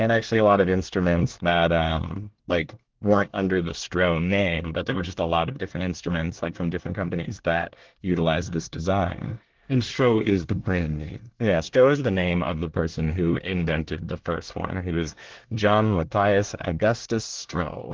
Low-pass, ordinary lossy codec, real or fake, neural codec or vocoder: 7.2 kHz; Opus, 16 kbps; fake; codec, 24 kHz, 1 kbps, SNAC